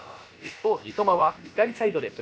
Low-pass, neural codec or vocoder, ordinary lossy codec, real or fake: none; codec, 16 kHz, about 1 kbps, DyCAST, with the encoder's durations; none; fake